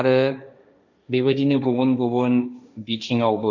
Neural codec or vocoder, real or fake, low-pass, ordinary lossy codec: codec, 16 kHz, 1.1 kbps, Voila-Tokenizer; fake; 7.2 kHz; none